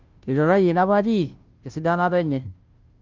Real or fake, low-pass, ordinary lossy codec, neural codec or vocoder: fake; 7.2 kHz; Opus, 32 kbps; codec, 16 kHz, 0.5 kbps, FunCodec, trained on Chinese and English, 25 frames a second